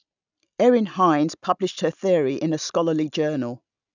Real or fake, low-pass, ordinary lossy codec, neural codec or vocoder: real; 7.2 kHz; none; none